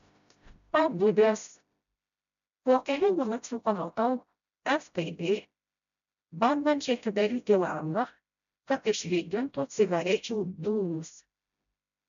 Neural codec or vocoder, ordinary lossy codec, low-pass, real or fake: codec, 16 kHz, 0.5 kbps, FreqCodec, smaller model; MP3, 96 kbps; 7.2 kHz; fake